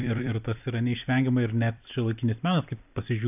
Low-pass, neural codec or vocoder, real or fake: 3.6 kHz; vocoder, 44.1 kHz, 128 mel bands every 256 samples, BigVGAN v2; fake